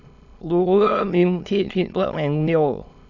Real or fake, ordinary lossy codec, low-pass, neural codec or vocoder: fake; none; 7.2 kHz; autoencoder, 22.05 kHz, a latent of 192 numbers a frame, VITS, trained on many speakers